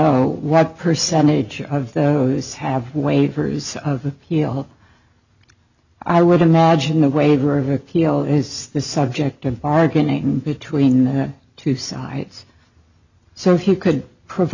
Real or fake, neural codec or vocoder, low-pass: real; none; 7.2 kHz